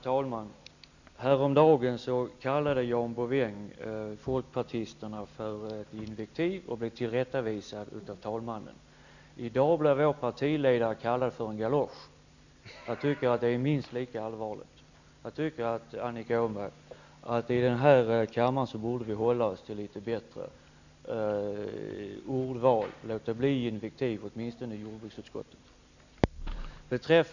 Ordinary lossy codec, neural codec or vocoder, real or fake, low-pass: none; none; real; 7.2 kHz